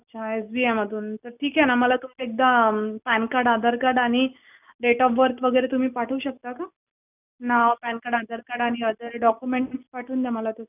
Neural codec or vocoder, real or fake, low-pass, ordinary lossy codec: none; real; 3.6 kHz; none